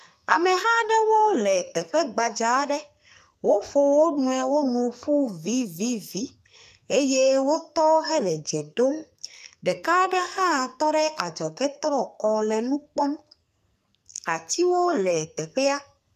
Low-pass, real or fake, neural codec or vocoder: 14.4 kHz; fake; codec, 44.1 kHz, 2.6 kbps, SNAC